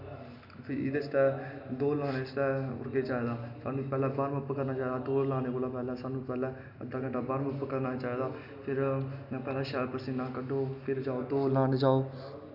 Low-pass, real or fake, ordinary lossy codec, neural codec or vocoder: 5.4 kHz; real; none; none